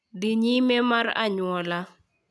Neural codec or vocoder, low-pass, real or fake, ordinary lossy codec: none; none; real; none